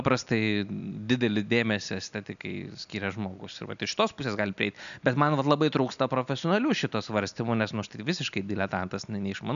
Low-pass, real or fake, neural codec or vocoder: 7.2 kHz; real; none